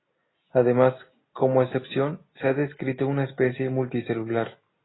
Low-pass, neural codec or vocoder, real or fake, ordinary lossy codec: 7.2 kHz; none; real; AAC, 16 kbps